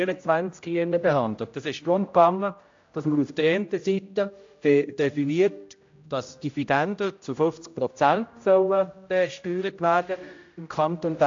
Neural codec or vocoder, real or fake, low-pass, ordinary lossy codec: codec, 16 kHz, 0.5 kbps, X-Codec, HuBERT features, trained on general audio; fake; 7.2 kHz; AAC, 48 kbps